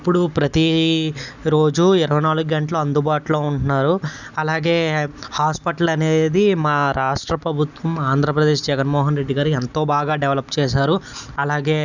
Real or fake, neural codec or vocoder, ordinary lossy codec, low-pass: real; none; none; 7.2 kHz